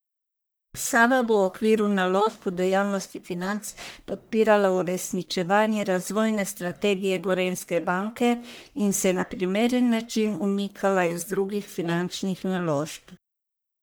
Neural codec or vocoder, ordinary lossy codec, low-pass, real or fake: codec, 44.1 kHz, 1.7 kbps, Pupu-Codec; none; none; fake